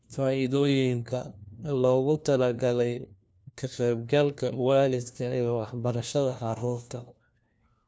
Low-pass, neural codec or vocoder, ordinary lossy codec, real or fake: none; codec, 16 kHz, 1 kbps, FunCodec, trained on LibriTTS, 50 frames a second; none; fake